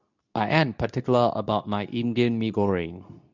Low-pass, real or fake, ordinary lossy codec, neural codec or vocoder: 7.2 kHz; fake; none; codec, 24 kHz, 0.9 kbps, WavTokenizer, medium speech release version 2